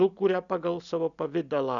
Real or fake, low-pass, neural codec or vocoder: real; 7.2 kHz; none